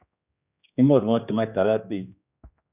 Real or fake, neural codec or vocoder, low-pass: fake; codec, 16 kHz, 2 kbps, X-Codec, HuBERT features, trained on general audio; 3.6 kHz